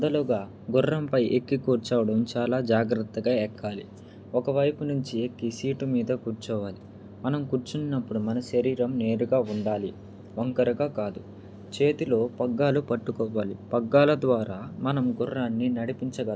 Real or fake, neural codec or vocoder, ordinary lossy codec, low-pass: real; none; none; none